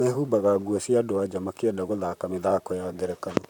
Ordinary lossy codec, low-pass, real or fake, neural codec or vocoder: none; 19.8 kHz; fake; vocoder, 44.1 kHz, 128 mel bands, Pupu-Vocoder